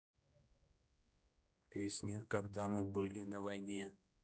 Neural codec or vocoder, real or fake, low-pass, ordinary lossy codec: codec, 16 kHz, 1 kbps, X-Codec, HuBERT features, trained on general audio; fake; none; none